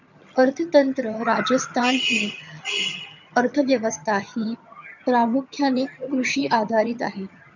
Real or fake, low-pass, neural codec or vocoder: fake; 7.2 kHz; vocoder, 22.05 kHz, 80 mel bands, HiFi-GAN